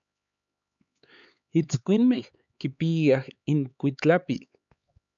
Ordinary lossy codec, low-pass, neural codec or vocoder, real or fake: MP3, 64 kbps; 7.2 kHz; codec, 16 kHz, 4 kbps, X-Codec, HuBERT features, trained on LibriSpeech; fake